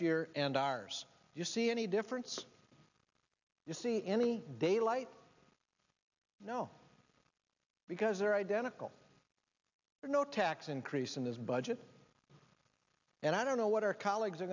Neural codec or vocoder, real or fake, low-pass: none; real; 7.2 kHz